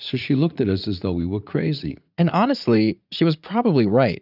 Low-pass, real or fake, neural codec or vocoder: 5.4 kHz; fake; vocoder, 44.1 kHz, 80 mel bands, Vocos